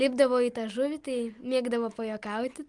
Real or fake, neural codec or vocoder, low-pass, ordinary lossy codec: real; none; 10.8 kHz; Opus, 32 kbps